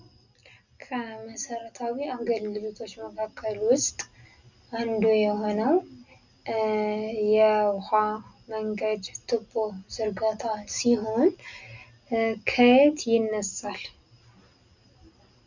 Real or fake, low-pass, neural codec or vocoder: real; 7.2 kHz; none